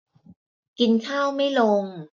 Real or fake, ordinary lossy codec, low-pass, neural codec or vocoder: real; MP3, 64 kbps; 7.2 kHz; none